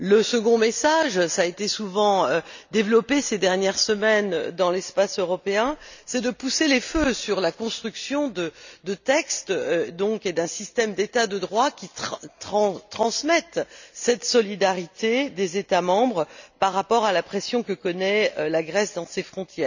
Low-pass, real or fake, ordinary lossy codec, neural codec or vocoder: 7.2 kHz; real; none; none